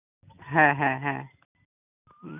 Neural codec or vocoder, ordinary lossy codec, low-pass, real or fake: none; none; 3.6 kHz; real